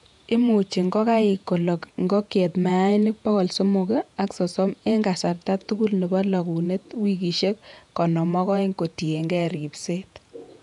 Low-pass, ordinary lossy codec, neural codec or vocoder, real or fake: 10.8 kHz; none; vocoder, 48 kHz, 128 mel bands, Vocos; fake